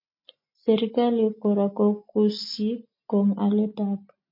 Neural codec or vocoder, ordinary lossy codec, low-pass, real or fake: none; MP3, 32 kbps; 5.4 kHz; real